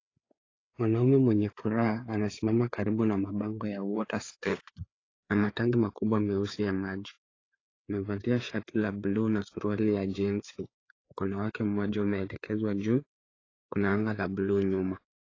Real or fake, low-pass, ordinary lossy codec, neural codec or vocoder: fake; 7.2 kHz; AAC, 32 kbps; codec, 16 kHz, 4 kbps, FreqCodec, larger model